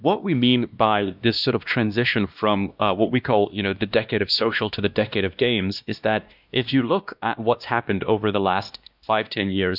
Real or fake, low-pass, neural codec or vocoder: fake; 5.4 kHz; codec, 16 kHz, 1 kbps, X-Codec, WavLM features, trained on Multilingual LibriSpeech